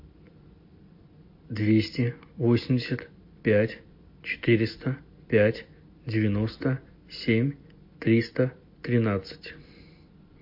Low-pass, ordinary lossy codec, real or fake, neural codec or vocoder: 5.4 kHz; MP3, 32 kbps; real; none